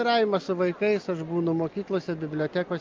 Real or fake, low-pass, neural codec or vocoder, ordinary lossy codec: real; 7.2 kHz; none; Opus, 24 kbps